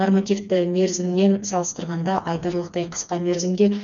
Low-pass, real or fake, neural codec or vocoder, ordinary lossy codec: 7.2 kHz; fake; codec, 16 kHz, 2 kbps, FreqCodec, smaller model; none